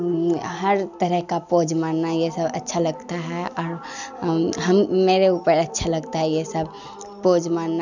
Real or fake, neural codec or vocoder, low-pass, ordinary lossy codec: real; none; 7.2 kHz; none